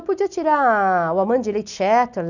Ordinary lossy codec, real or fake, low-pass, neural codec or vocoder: none; real; 7.2 kHz; none